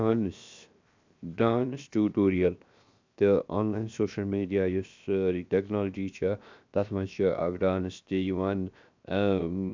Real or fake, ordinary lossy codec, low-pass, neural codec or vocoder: fake; MP3, 64 kbps; 7.2 kHz; codec, 16 kHz, 0.7 kbps, FocalCodec